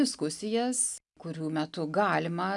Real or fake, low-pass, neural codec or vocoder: real; 10.8 kHz; none